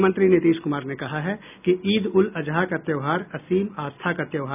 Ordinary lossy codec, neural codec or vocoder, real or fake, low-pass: none; none; real; 3.6 kHz